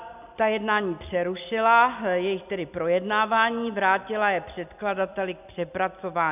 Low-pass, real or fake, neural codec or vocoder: 3.6 kHz; real; none